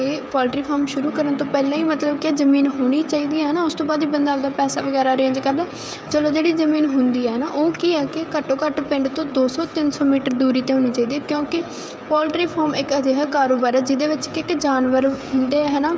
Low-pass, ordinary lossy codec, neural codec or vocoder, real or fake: none; none; codec, 16 kHz, 16 kbps, FreqCodec, smaller model; fake